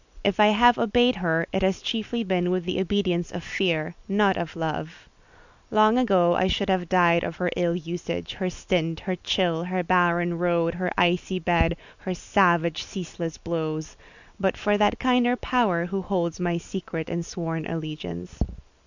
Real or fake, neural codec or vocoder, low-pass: real; none; 7.2 kHz